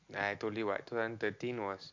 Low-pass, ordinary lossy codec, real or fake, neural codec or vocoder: 7.2 kHz; MP3, 48 kbps; real; none